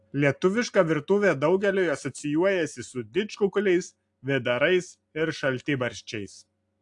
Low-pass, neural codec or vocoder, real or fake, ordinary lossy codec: 10.8 kHz; none; real; AAC, 64 kbps